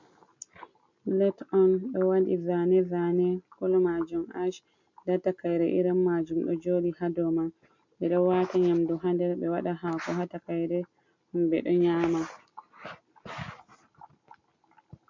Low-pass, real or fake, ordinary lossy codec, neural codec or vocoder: 7.2 kHz; real; MP3, 64 kbps; none